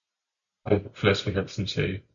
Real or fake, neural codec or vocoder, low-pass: real; none; 7.2 kHz